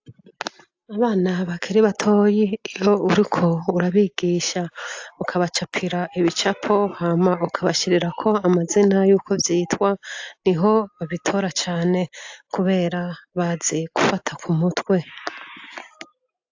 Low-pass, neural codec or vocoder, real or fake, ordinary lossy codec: 7.2 kHz; none; real; AAC, 48 kbps